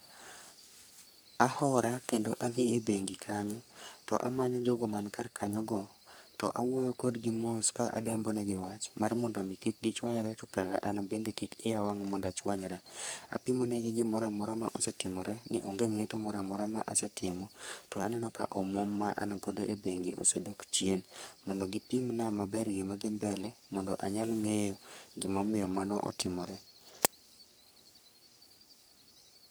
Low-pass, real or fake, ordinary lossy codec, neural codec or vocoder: none; fake; none; codec, 44.1 kHz, 3.4 kbps, Pupu-Codec